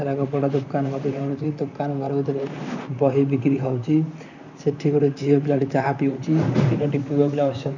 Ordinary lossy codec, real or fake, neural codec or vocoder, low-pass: none; fake; vocoder, 44.1 kHz, 128 mel bands, Pupu-Vocoder; 7.2 kHz